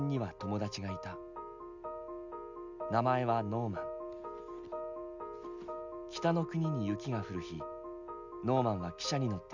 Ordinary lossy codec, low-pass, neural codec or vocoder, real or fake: none; 7.2 kHz; none; real